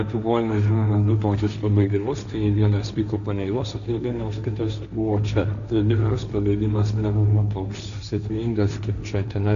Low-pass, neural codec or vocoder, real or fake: 7.2 kHz; codec, 16 kHz, 1.1 kbps, Voila-Tokenizer; fake